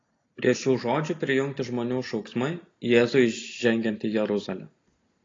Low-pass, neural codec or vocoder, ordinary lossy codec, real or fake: 7.2 kHz; none; AAC, 32 kbps; real